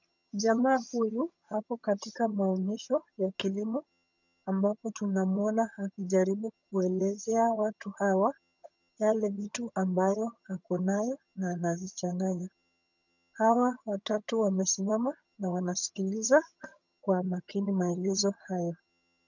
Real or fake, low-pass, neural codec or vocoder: fake; 7.2 kHz; vocoder, 22.05 kHz, 80 mel bands, HiFi-GAN